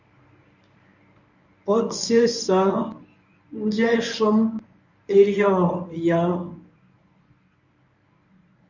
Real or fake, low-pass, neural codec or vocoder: fake; 7.2 kHz; codec, 24 kHz, 0.9 kbps, WavTokenizer, medium speech release version 1